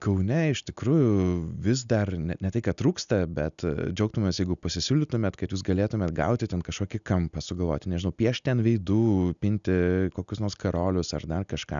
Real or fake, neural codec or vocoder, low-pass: real; none; 7.2 kHz